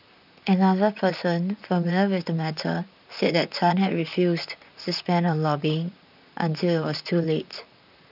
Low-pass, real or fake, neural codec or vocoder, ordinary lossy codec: 5.4 kHz; fake; vocoder, 44.1 kHz, 128 mel bands, Pupu-Vocoder; none